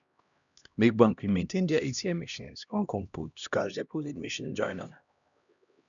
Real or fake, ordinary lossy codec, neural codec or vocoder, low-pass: fake; none; codec, 16 kHz, 1 kbps, X-Codec, HuBERT features, trained on LibriSpeech; 7.2 kHz